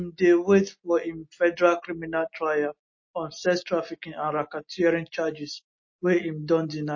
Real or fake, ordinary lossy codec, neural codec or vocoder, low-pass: real; MP3, 32 kbps; none; 7.2 kHz